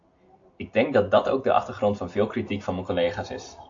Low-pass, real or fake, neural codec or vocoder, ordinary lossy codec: 7.2 kHz; real; none; AAC, 48 kbps